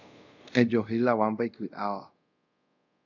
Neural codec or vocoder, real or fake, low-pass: codec, 24 kHz, 0.5 kbps, DualCodec; fake; 7.2 kHz